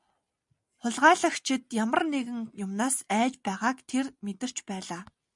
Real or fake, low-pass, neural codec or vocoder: real; 10.8 kHz; none